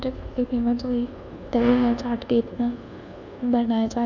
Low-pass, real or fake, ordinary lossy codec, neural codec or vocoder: 7.2 kHz; fake; none; codec, 24 kHz, 1.2 kbps, DualCodec